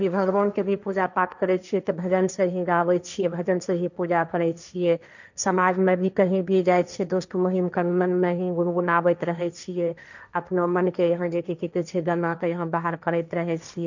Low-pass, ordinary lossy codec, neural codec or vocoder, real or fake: 7.2 kHz; none; codec, 16 kHz, 1.1 kbps, Voila-Tokenizer; fake